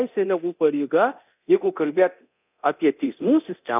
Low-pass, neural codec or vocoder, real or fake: 3.6 kHz; codec, 24 kHz, 0.9 kbps, DualCodec; fake